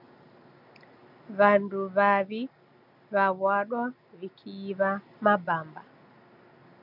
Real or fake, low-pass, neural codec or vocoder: real; 5.4 kHz; none